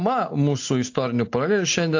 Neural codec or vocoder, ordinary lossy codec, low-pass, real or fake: none; AAC, 48 kbps; 7.2 kHz; real